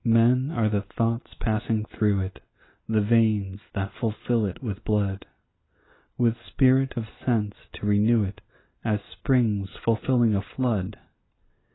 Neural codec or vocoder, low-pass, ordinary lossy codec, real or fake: none; 7.2 kHz; AAC, 16 kbps; real